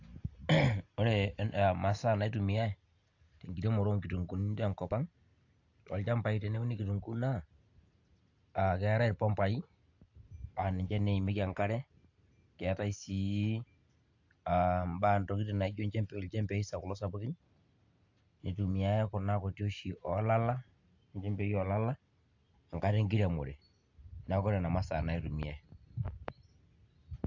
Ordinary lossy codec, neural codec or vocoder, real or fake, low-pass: AAC, 48 kbps; none; real; 7.2 kHz